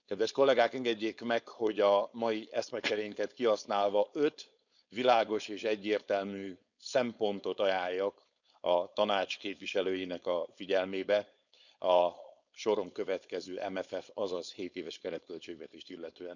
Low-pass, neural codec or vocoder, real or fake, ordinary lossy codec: 7.2 kHz; codec, 16 kHz, 4.8 kbps, FACodec; fake; none